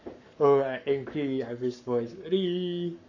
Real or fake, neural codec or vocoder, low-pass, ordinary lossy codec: fake; codec, 44.1 kHz, 7.8 kbps, Pupu-Codec; 7.2 kHz; none